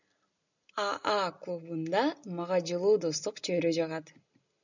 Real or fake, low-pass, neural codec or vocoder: real; 7.2 kHz; none